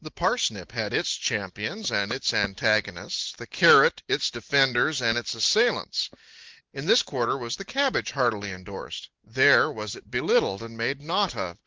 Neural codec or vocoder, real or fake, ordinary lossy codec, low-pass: none; real; Opus, 16 kbps; 7.2 kHz